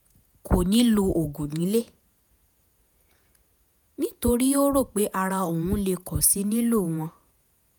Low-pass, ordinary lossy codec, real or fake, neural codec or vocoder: none; none; fake; vocoder, 48 kHz, 128 mel bands, Vocos